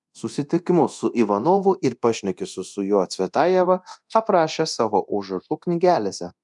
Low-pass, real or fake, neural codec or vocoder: 10.8 kHz; fake; codec, 24 kHz, 0.9 kbps, DualCodec